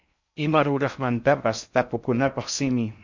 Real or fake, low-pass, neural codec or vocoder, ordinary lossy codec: fake; 7.2 kHz; codec, 16 kHz in and 24 kHz out, 0.6 kbps, FocalCodec, streaming, 4096 codes; MP3, 64 kbps